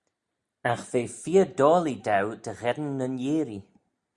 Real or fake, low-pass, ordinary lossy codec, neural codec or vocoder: real; 10.8 kHz; Opus, 64 kbps; none